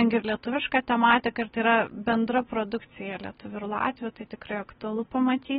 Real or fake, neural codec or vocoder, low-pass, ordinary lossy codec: real; none; 19.8 kHz; AAC, 16 kbps